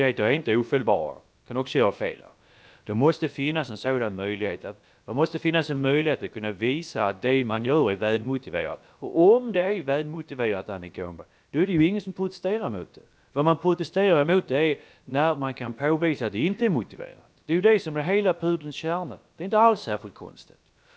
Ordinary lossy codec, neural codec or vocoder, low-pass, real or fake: none; codec, 16 kHz, about 1 kbps, DyCAST, with the encoder's durations; none; fake